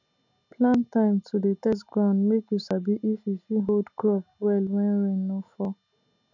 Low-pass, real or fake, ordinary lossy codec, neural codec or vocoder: 7.2 kHz; real; none; none